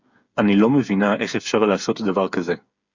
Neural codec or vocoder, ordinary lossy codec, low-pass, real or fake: codec, 16 kHz, 8 kbps, FreqCodec, smaller model; Opus, 64 kbps; 7.2 kHz; fake